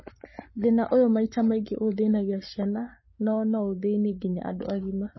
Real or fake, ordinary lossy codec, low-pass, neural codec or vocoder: fake; MP3, 24 kbps; 7.2 kHz; codec, 44.1 kHz, 7.8 kbps, Pupu-Codec